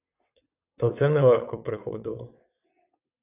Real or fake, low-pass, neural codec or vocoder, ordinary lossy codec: fake; 3.6 kHz; vocoder, 22.05 kHz, 80 mel bands, WaveNeXt; AAC, 32 kbps